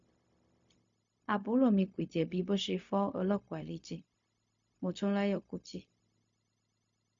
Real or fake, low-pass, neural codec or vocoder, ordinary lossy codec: fake; 7.2 kHz; codec, 16 kHz, 0.4 kbps, LongCat-Audio-Codec; MP3, 48 kbps